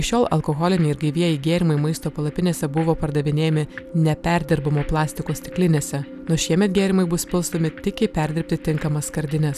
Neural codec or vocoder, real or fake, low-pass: none; real; 14.4 kHz